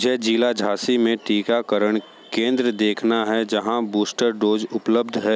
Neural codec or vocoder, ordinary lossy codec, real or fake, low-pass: none; none; real; none